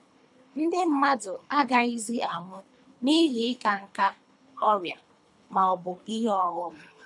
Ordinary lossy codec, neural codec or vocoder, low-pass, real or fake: none; codec, 24 kHz, 3 kbps, HILCodec; 10.8 kHz; fake